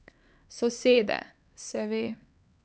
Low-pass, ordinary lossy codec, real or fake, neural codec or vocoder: none; none; fake; codec, 16 kHz, 4 kbps, X-Codec, HuBERT features, trained on LibriSpeech